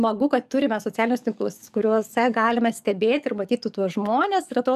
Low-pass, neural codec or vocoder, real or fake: 14.4 kHz; codec, 44.1 kHz, 7.8 kbps, DAC; fake